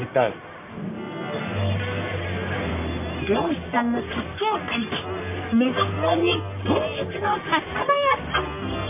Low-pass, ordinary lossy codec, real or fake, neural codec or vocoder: 3.6 kHz; MP3, 24 kbps; fake; codec, 44.1 kHz, 1.7 kbps, Pupu-Codec